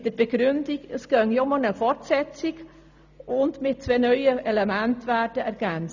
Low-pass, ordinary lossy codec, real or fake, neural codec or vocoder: 7.2 kHz; none; real; none